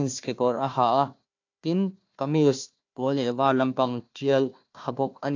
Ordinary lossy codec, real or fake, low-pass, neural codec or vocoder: AAC, 48 kbps; fake; 7.2 kHz; codec, 16 kHz, 1 kbps, FunCodec, trained on Chinese and English, 50 frames a second